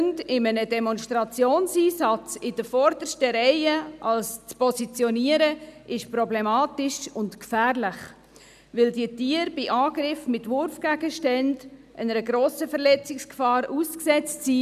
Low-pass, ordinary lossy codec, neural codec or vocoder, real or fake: 14.4 kHz; none; none; real